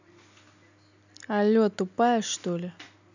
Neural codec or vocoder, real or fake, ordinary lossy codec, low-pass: none; real; none; 7.2 kHz